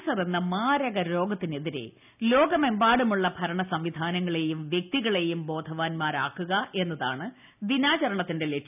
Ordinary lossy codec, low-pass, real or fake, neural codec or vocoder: none; 3.6 kHz; real; none